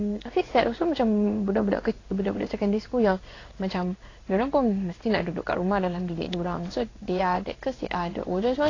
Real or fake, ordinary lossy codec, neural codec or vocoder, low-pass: fake; AAC, 32 kbps; codec, 16 kHz in and 24 kHz out, 1 kbps, XY-Tokenizer; 7.2 kHz